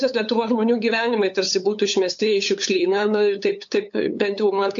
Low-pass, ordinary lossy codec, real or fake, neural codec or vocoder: 7.2 kHz; AAC, 64 kbps; fake; codec, 16 kHz, 8 kbps, FunCodec, trained on LibriTTS, 25 frames a second